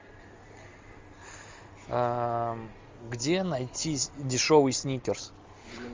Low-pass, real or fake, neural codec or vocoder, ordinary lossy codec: 7.2 kHz; real; none; Opus, 32 kbps